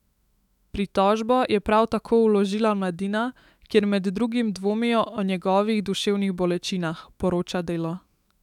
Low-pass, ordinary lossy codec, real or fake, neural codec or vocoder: 19.8 kHz; none; fake; autoencoder, 48 kHz, 128 numbers a frame, DAC-VAE, trained on Japanese speech